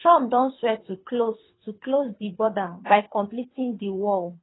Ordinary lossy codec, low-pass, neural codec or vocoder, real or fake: AAC, 16 kbps; 7.2 kHz; codec, 24 kHz, 3 kbps, HILCodec; fake